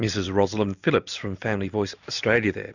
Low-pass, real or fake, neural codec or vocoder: 7.2 kHz; real; none